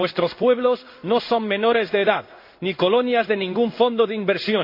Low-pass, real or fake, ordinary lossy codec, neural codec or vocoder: 5.4 kHz; fake; MP3, 32 kbps; codec, 16 kHz in and 24 kHz out, 1 kbps, XY-Tokenizer